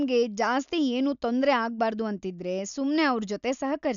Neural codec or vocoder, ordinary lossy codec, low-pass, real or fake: none; none; 7.2 kHz; real